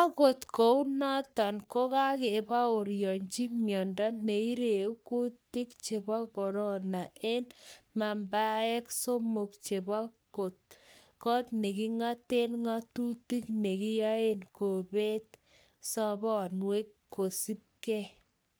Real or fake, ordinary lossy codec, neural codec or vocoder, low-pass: fake; none; codec, 44.1 kHz, 3.4 kbps, Pupu-Codec; none